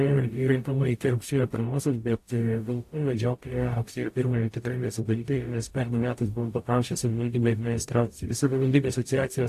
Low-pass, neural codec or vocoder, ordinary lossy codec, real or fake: 14.4 kHz; codec, 44.1 kHz, 0.9 kbps, DAC; AAC, 64 kbps; fake